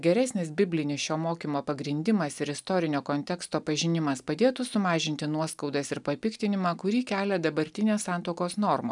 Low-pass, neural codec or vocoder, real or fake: 10.8 kHz; none; real